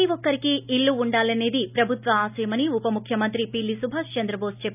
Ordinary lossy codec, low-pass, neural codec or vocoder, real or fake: none; 3.6 kHz; none; real